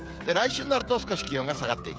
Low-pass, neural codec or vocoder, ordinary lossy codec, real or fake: none; codec, 16 kHz, 8 kbps, FreqCodec, smaller model; none; fake